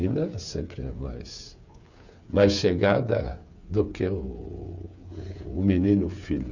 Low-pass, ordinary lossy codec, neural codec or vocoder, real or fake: 7.2 kHz; none; codec, 16 kHz, 8 kbps, FreqCodec, smaller model; fake